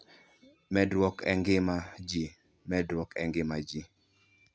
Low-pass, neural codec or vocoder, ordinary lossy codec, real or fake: none; none; none; real